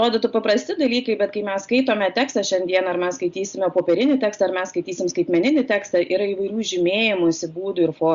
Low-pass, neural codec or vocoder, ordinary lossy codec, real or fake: 7.2 kHz; none; Opus, 64 kbps; real